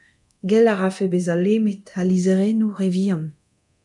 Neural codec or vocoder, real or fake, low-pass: codec, 24 kHz, 0.9 kbps, DualCodec; fake; 10.8 kHz